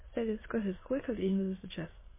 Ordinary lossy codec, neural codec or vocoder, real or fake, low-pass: MP3, 16 kbps; autoencoder, 22.05 kHz, a latent of 192 numbers a frame, VITS, trained on many speakers; fake; 3.6 kHz